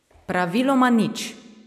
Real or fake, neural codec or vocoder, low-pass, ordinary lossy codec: real; none; 14.4 kHz; none